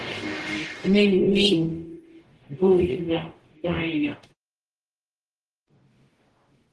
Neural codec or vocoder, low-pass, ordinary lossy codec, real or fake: codec, 44.1 kHz, 0.9 kbps, DAC; 10.8 kHz; Opus, 16 kbps; fake